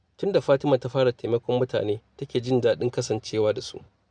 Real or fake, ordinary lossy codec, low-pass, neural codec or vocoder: real; none; 9.9 kHz; none